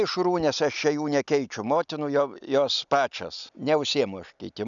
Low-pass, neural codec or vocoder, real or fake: 7.2 kHz; none; real